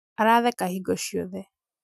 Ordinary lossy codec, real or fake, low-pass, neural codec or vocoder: none; real; 14.4 kHz; none